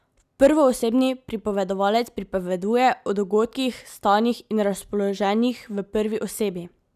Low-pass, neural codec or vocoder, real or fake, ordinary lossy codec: 14.4 kHz; none; real; none